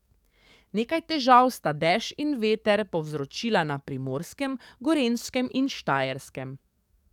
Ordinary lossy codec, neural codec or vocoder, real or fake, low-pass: none; codec, 44.1 kHz, 7.8 kbps, DAC; fake; 19.8 kHz